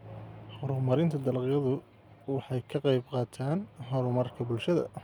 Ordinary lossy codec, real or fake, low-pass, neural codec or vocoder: none; real; 19.8 kHz; none